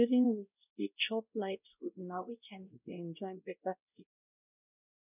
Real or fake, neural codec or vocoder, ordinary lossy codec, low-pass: fake; codec, 16 kHz, 0.5 kbps, X-Codec, WavLM features, trained on Multilingual LibriSpeech; none; 3.6 kHz